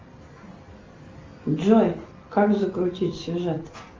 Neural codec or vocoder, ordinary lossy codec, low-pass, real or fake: none; Opus, 32 kbps; 7.2 kHz; real